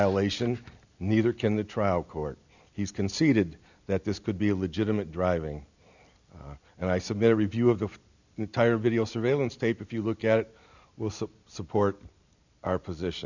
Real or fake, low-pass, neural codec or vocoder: real; 7.2 kHz; none